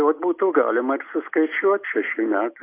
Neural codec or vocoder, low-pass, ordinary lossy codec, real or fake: none; 3.6 kHz; AAC, 24 kbps; real